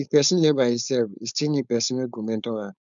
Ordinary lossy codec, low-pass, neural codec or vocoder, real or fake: MP3, 96 kbps; 7.2 kHz; codec, 16 kHz, 4.8 kbps, FACodec; fake